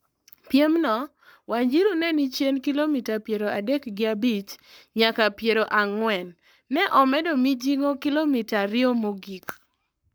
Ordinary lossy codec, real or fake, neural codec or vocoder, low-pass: none; fake; codec, 44.1 kHz, 7.8 kbps, Pupu-Codec; none